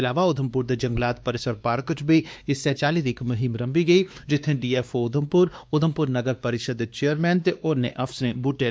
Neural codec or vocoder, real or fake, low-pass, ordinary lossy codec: codec, 16 kHz, 2 kbps, X-Codec, WavLM features, trained on Multilingual LibriSpeech; fake; none; none